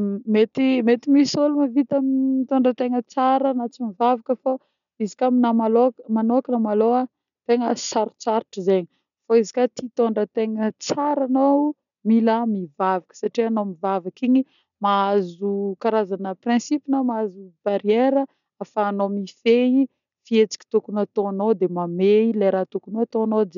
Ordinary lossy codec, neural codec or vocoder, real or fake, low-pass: none; none; real; 7.2 kHz